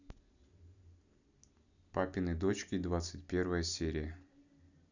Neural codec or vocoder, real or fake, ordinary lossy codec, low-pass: none; real; none; 7.2 kHz